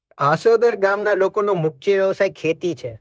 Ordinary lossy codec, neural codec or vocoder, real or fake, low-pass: none; codec, 16 kHz, 0.9 kbps, LongCat-Audio-Codec; fake; none